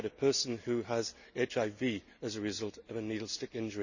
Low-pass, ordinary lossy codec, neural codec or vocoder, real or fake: 7.2 kHz; none; none; real